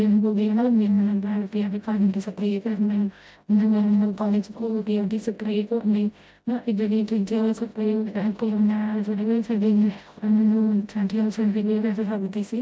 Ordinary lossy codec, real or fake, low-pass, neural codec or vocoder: none; fake; none; codec, 16 kHz, 0.5 kbps, FreqCodec, smaller model